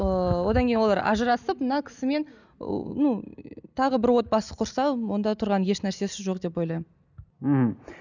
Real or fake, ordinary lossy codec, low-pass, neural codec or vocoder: real; none; 7.2 kHz; none